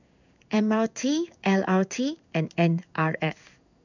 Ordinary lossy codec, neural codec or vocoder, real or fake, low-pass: none; codec, 16 kHz in and 24 kHz out, 1 kbps, XY-Tokenizer; fake; 7.2 kHz